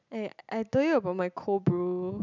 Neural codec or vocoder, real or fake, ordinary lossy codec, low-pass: none; real; none; 7.2 kHz